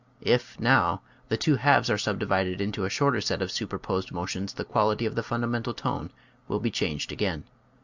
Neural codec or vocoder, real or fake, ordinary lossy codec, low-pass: none; real; Opus, 64 kbps; 7.2 kHz